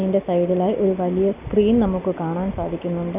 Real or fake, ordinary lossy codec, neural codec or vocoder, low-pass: real; none; none; 3.6 kHz